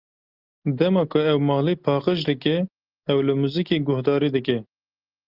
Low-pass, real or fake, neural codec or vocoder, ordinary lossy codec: 5.4 kHz; real; none; Opus, 32 kbps